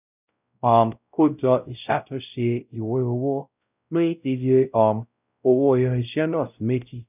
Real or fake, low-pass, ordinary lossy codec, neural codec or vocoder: fake; 3.6 kHz; none; codec, 16 kHz, 0.5 kbps, X-Codec, WavLM features, trained on Multilingual LibriSpeech